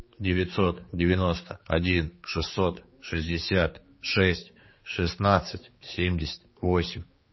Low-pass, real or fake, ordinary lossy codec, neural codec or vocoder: 7.2 kHz; fake; MP3, 24 kbps; codec, 16 kHz, 4 kbps, X-Codec, HuBERT features, trained on general audio